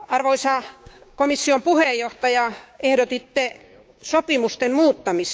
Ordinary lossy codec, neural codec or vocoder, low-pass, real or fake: none; codec, 16 kHz, 6 kbps, DAC; none; fake